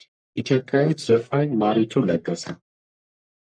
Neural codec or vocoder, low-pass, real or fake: codec, 44.1 kHz, 1.7 kbps, Pupu-Codec; 9.9 kHz; fake